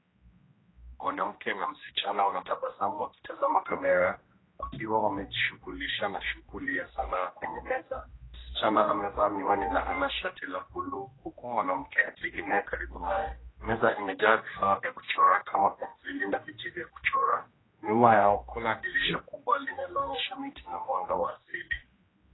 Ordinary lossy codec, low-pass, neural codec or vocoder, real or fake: AAC, 16 kbps; 7.2 kHz; codec, 16 kHz, 1 kbps, X-Codec, HuBERT features, trained on general audio; fake